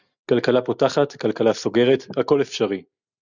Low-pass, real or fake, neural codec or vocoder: 7.2 kHz; real; none